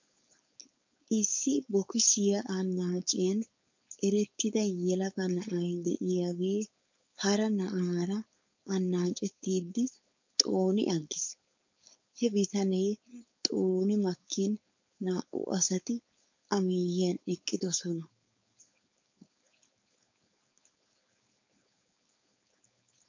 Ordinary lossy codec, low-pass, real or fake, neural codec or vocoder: MP3, 64 kbps; 7.2 kHz; fake; codec, 16 kHz, 4.8 kbps, FACodec